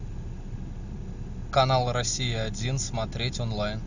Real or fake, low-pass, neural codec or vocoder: real; 7.2 kHz; none